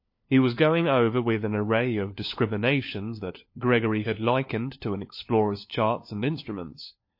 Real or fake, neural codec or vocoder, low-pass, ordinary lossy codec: fake; codec, 16 kHz, 4 kbps, FunCodec, trained on LibriTTS, 50 frames a second; 5.4 kHz; MP3, 32 kbps